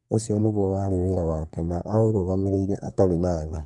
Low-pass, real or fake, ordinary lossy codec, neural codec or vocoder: 10.8 kHz; fake; none; codec, 24 kHz, 1 kbps, SNAC